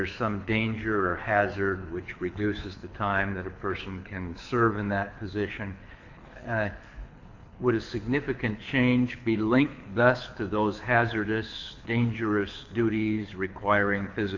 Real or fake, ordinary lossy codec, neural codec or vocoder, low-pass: fake; AAC, 48 kbps; codec, 24 kHz, 6 kbps, HILCodec; 7.2 kHz